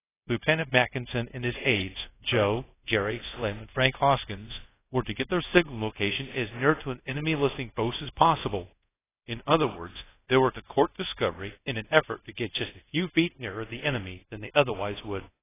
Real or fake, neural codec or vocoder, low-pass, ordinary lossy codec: fake; codec, 24 kHz, 0.5 kbps, DualCodec; 3.6 kHz; AAC, 16 kbps